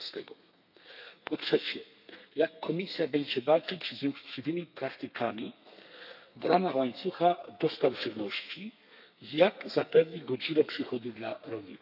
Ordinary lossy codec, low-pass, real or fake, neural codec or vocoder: none; 5.4 kHz; fake; codec, 32 kHz, 1.9 kbps, SNAC